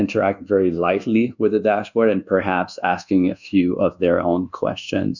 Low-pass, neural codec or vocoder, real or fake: 7.2 kHz; codec, 24 kHz, 1.2 kbps, DualCodec; fake